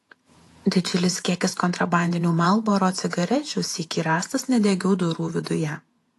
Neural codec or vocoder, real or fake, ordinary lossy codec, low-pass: vocoder, 48 kHz, 128 mel bands, Vocos; fake; AAC, 48 kbps; 14.4 kHz